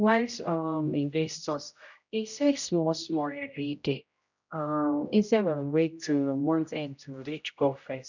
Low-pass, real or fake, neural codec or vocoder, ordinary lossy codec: 7.2 kHz; fake; codec, 16 kHz, 0.5 kbps, X-Codec, HuBERT features, trained on general audio; none